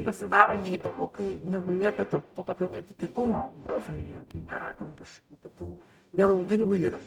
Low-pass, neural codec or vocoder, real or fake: 19.8 kHz; codec, 44.1 kHz, 0.9 kbps, DAC; fake